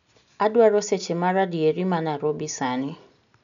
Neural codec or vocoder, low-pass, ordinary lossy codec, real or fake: none; 7.2 kHz; none; real